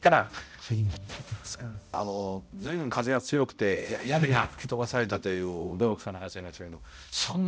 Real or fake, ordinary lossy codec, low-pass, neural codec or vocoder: fake; none; none; codec, 16 kHz, 0.5 kbps, X-Codec, HuBERT features, trained on balanced general audio